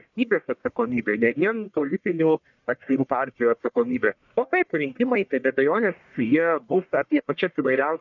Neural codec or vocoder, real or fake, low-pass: codec, 44.1 kHz, 1.7 kbps, Pupu-Codec; fake; 7.2 kHz